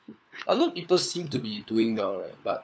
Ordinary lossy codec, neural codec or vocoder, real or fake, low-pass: none; codec, 16 kHz, 4 kbps, FunCodec, trained on LibriTTS, 50 frames a second; fake; none